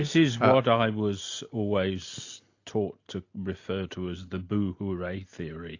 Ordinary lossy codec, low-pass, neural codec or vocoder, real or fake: AAC, 48 kbps; 7.2 kHz; none; real